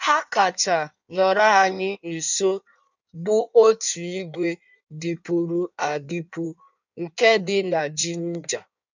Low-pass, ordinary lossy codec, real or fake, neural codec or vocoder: 7.2 kHz; none; fake; codec, 16 kHz in and 24 kHz out, 1.1 kbps, FireRedTTS-2 codec